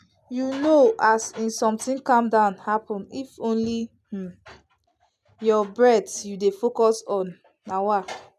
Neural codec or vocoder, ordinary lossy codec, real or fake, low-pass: none; none; real; 14.4 kHz